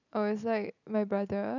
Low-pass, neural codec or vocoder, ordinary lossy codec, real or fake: 7.2 kHz; none; none; real